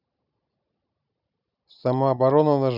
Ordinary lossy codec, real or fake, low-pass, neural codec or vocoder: none; real; 5.4 kHz; none